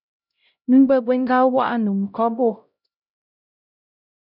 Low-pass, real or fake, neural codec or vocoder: 5.4 kHz; fake; codec, 16 kHz, 0.5 kbps, X-Codec, HuBERT features, trained on LibriSpeech